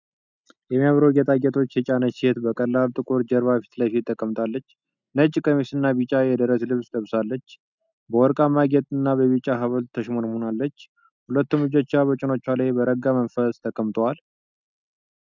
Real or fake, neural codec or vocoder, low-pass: real; none; 7.2 kHz